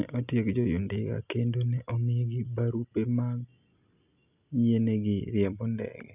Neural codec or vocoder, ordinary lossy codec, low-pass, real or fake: none; none; 3.6 kHz; real